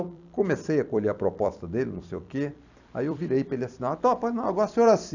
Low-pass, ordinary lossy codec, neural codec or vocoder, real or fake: 7.2 kHz; none; vocoder, 44.1 kHz, 128 mel bands every 256 samples, BigVGAN v2; fake